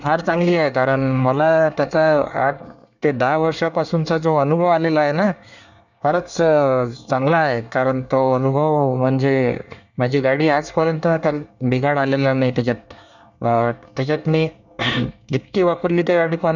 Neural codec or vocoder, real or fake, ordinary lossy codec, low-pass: codec, 24 kHz, 1 kbps, SNAC; fake; none; 7.2 kHz